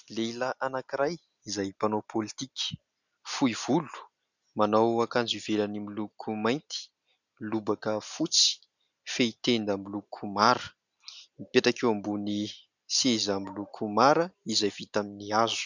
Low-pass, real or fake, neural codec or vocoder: 7.2 kHz; real; none